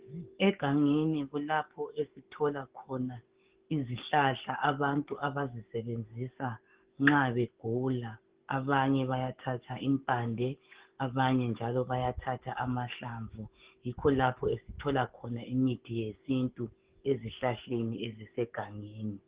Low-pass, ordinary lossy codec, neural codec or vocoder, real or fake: 3.6 kHz; Opus, 16 kbps; codec, 44.1 kHz, 7.8 kbps, DAC; fake